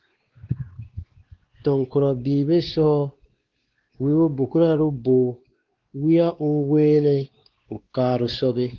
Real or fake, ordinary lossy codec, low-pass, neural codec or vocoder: fake; Opus, 16 kbps; 7.2 kHz; codec, 16 kHz, 2 kbps, X-Codec, WavLM features, trained on Multilingual LibriSpeech